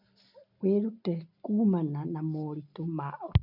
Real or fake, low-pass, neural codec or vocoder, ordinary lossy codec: real; 5.4 kHz; none; none